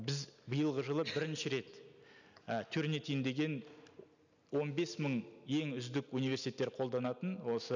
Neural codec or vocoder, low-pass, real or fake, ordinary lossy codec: none; 7.2 kHz; real; none